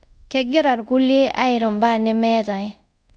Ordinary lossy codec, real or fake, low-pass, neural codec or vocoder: none; fake; 9.9 kHz; codec, 24 kHz, 0.5 kbps, DualCodec